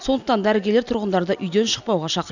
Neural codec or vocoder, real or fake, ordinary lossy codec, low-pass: none; real; none; 7.2 kHz